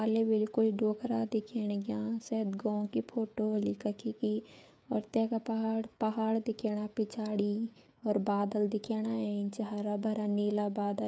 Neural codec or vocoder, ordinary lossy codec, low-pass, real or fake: codec, 16 kHz, 16 kbps, FreqCodec, smaller model; none; none; fake